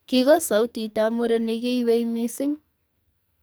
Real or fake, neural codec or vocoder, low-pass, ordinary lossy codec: fake; codec, 44.1 kHz, 2.6 kbps, SNAC; none; none